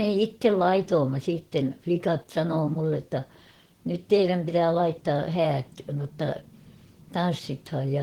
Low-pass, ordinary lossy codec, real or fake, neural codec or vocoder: 19.8 kHz; Opus, 16 kbps; fake; vocoder, 44.1 kHz, 128 mel bands, Pupu-Vocoder